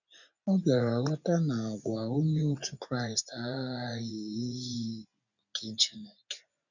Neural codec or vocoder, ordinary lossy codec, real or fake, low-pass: vocoder, 24 kHz, 100 mel bands, Vocos; none; fake; 7.2 kHz